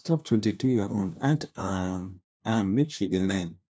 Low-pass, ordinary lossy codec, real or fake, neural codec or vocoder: none; none; fake; codec, 16 kHz, 1 kbps, FunCodec, trained on LibriTTS, 50 frames a second